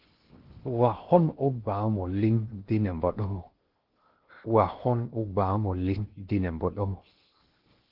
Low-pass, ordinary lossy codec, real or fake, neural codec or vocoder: 5.4 kHz; Opus, 16 kbps; fake; codec, 16 kHz in and 24 kHz out, 0.6 kbps, FocalCodec, streaming, 2048 codes